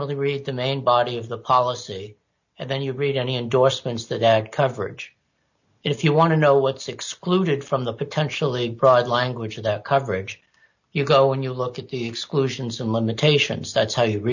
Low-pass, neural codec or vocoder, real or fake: 7.2 kHz; none; real